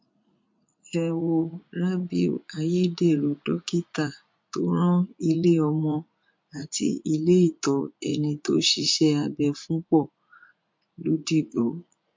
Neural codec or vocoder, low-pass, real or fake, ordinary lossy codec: vocoder, 44.1 kHz, 80 mel bands, Vocos; 7.2 kHz; fake; MP3, 48 kbps